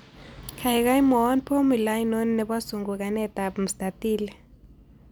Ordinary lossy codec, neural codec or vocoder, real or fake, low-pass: none; none; real; none